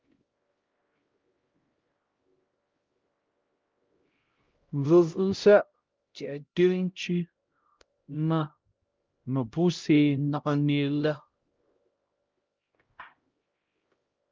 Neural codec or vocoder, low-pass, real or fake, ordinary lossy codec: codec, 16 kHz, 0.5 kbps, X-Codec, HuBERT features, trained on LibriSpeech; 7.2 kHz; fake; Opus, 32 kbps